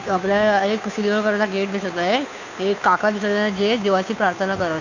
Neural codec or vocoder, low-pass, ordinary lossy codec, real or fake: codec, 16 kHz, 2 kbps, FunCodec, trained on Chinese and English, 25 frames a second; 7.2 kHz; none; fake